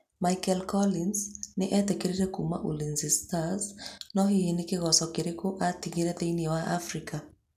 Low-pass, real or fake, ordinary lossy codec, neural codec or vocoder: 14.4 kHz; real; none; none